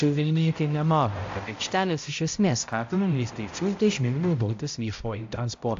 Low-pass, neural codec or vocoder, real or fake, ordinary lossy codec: 7.2 kHz; codec, 16 kHz, 0.5 kbps, X-Codec, HuBERT features, trained on balanced general audio; fake; AAC, 48 kbps